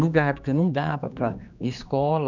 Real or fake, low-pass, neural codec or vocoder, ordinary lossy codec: fake; 7.2 kHz; codec, 16 kHz, 2 kbps, X-Codec, HuBERT features, trained on general audio; none